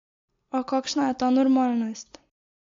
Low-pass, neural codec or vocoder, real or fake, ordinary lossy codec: 7.2 kHz; none; real; MP3, 48 kbps